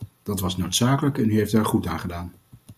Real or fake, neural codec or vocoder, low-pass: real; none; 14.4 kHz